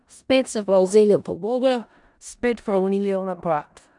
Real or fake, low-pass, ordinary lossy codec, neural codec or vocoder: fake; 10.8 kHz; none; codec, 16 kHz in and 24 kHz out, 0.4 kbps, LongCat-Audio-Codec, four codebook decoder